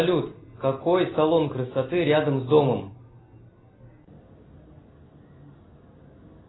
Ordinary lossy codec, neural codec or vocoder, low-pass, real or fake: AAC, 16 kbps; none; 7.2 kHz; real